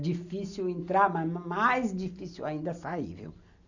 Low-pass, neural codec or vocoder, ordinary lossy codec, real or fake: 7.2 kHz; none; none; real